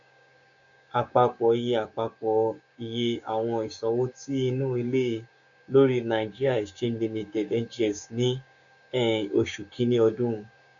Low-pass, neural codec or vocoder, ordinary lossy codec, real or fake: 7.2 kHz; codec, 16 kHz, 6 kbps, DAC; MP3, 96 kbps; fake